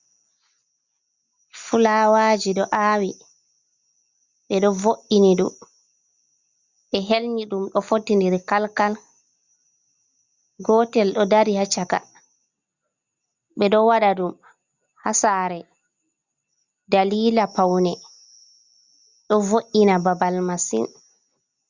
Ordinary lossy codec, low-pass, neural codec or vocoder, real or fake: Opus, 64 kbps; 7.2 kHz; none; real